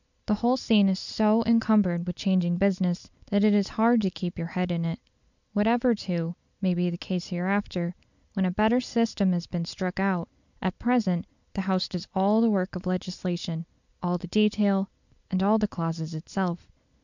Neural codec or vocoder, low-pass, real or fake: none; 7.2 kHz; real